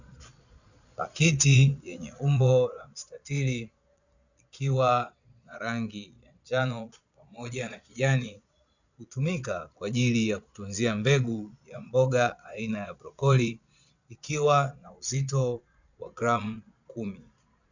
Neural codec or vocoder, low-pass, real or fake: vocoder, 22.05 kHz, 80 mel bands, Vocos; 7.2 kHz; fake